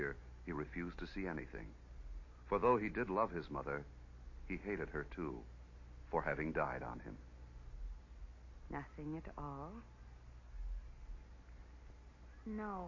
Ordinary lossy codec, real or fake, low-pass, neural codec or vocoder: MP3, 32 kbps; real; 7.2 kHz; none